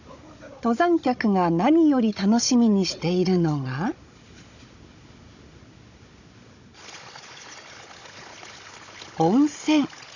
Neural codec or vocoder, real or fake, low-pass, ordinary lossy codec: codec, 16 kHz, 16 kbps, FunCodec, trained on Chinese and English, 50 frames a second; fake; 7.2 kHz; none